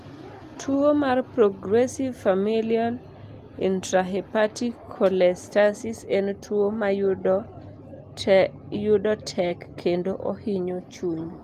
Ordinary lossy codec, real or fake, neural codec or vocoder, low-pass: Opus, 24 kbps; real; none; 14.4 kHz